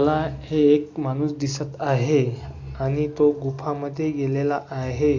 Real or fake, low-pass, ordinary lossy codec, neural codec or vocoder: real; 7.2 kHz; AAC, 48 kbps; none